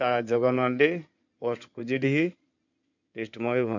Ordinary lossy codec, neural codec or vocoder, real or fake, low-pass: none; codec, 16 kHz in and 24 kHz out, 2.2 kbps, FireRedTTS-2 codec; fake; 7.2 kHz